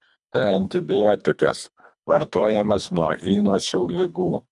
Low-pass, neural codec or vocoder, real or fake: 10.8 kHz; codec, 24 kHz, 1.5 kbps, HILCodec; fake